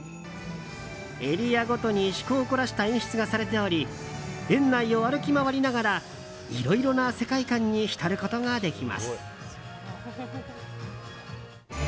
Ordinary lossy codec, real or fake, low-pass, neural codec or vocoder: none; real; none; none